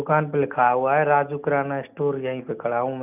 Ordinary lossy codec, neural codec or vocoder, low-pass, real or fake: none; none; 3.6 kHz; real